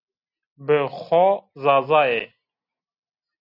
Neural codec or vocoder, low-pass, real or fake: none; 5.4 kHz; real